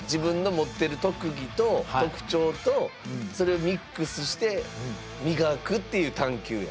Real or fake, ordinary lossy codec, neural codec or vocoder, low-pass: real; none; none; none